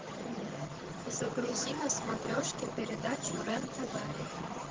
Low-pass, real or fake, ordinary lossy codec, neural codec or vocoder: 7.2 kHz; fake; Opus, 16 kbps; vocoder, 22.05 kHz, 80 mel bands, HiFi-GAN